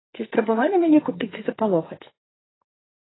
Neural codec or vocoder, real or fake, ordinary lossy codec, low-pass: codec, 44.1 kHz, 2.6 kbps, SNAC; fake; AAC, 16 kbps; 7.2 kHz